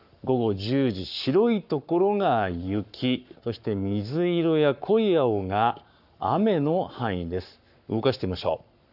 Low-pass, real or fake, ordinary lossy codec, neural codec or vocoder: 5.4 kHz; fake; none; codec, 44.1 kHz, 7.8 kbps, Pupu-Codec